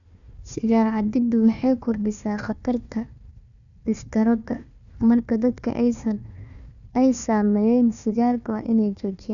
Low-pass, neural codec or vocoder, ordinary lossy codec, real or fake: 7.2 kHz; codec, 16 kHz, 1 kbps, FunCodec, trained on Chinese and English, 50 frames a second; none; fake